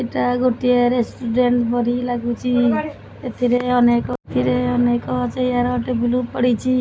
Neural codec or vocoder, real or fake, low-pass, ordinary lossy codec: none; real; none; none